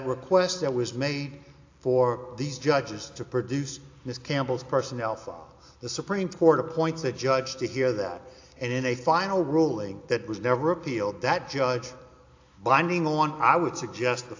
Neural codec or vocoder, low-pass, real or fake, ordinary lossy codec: none; 7.2 kHz; real; AAC, 48 kbps